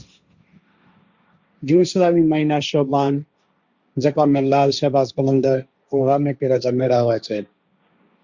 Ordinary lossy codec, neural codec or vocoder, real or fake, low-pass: Opus, 64 kbps; codec, 16 kHz, 1.1 kbps, Voila-Tokenizer; fake; 7.2 kHz